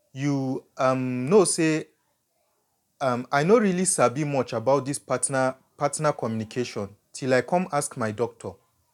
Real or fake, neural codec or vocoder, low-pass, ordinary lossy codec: real; none; 19.8 kHz; none